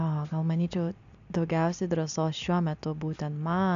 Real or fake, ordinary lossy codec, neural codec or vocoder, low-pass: real; AAC, 96 kbps; none; 7.2 kHz